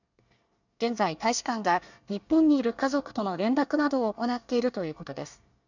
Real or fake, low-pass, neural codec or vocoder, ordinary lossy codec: fake; 7.2 kHz; codec, 24 kHz, 1 kbps, SNAC; none